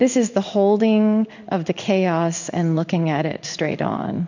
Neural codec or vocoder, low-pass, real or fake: codec, 16 kHz in and 24 kHz out, 1 kbps, XY-Tokenizer; 7.2 kHz; fake